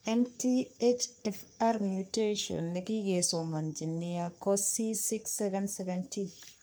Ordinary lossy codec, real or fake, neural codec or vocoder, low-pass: none; fake; codec, 44.1 kHz, 3.4 kbps, Pupu-Codec; none